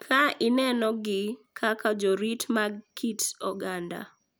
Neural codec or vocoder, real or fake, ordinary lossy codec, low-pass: none; real; none; none